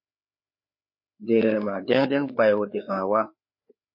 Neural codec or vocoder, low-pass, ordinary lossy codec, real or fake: codec, 16 kHz, 4 kbps, FreqCodec, larger model; 5.4 kHz; MP3, 32 kbps; fake